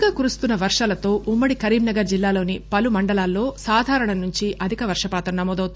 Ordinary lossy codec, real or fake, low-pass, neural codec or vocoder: none; real; none; none